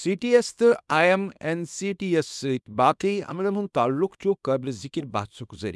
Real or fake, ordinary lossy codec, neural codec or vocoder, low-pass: fake; none; codec, 24 kHz, 0.9 kbps, WavTokenizer, small release; none